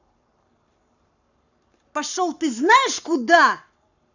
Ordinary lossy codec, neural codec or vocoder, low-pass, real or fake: none; codec, 44.1 kHz, 7.8 kbps, Pupu-Codec; 7.2 kHz; fake